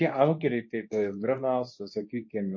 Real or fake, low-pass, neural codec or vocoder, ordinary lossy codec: fake; 7.2 kHz; codec, 24 kHz, 0.9 kbps, WavTokenizer, medium speech release version 2; MP3, 32 kbps